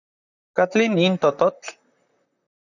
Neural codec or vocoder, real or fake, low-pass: vocoder, 44.1 kHz, 128 mel bands, Pupu-Vocoder; fake; 7.2 kHz